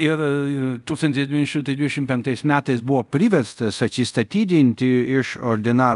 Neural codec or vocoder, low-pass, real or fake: codec, 24 kHz, 0.5 kbps, DualCodec; 10.8 kHz; fake